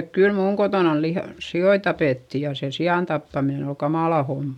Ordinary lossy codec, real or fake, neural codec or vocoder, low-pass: none; real; none; 19.8 kHz